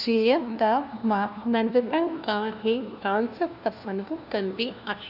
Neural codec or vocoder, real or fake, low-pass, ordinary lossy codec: codec, 16 kHz, 1 kbps, FunCodec, trained on LibriTTS, 50 frames a second; fake; 5.4 kHz; none